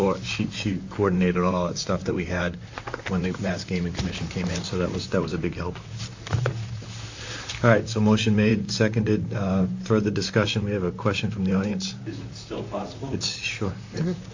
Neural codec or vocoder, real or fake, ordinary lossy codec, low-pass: vocoder, 44.1 kHz, 128 mel bands, Pupu-Vocoder; fake; AAC, 48 kbps; 7.2 kHz